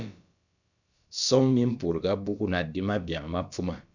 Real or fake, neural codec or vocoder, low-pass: fake; codec, 16 kHz, about 1 kbps, DyCAST, with the encoder's durations; 7.2 kHz